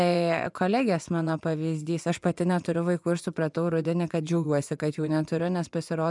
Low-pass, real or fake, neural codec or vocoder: 10.8 kHz; fake; vocoder, 24 kHz, 100 mel bands, Vocos